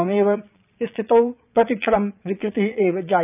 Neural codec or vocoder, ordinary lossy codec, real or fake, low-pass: vocoder, 22.05 kHz, 80 mel bands, Vocos; none; fake; 3.6 kHz